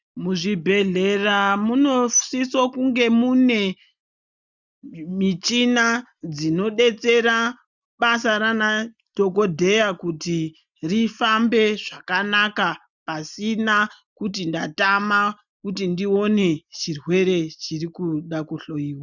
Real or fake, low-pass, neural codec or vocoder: real; 7.2 kHz; none